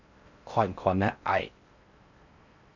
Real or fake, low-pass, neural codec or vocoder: fake; 7.2 kHz; codec, 16 kHz in and 24 kHz out, 0.6 kbps, FocalCodec, streaming, 4096 codes